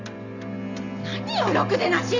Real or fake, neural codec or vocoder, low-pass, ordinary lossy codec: real; none; 7.2 kHz; none